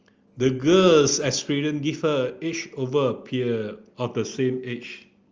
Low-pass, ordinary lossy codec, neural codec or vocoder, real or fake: 7.2 kHz; Opus, 24 kbps; none; real